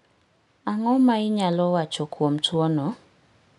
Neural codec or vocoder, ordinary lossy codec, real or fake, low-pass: none; none; real; 10.8 kHz